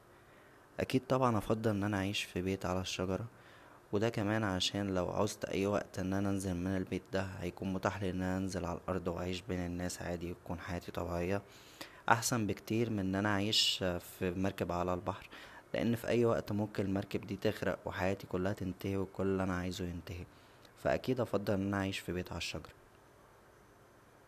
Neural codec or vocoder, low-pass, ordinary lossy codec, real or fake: none; 14.4 kHz; none; real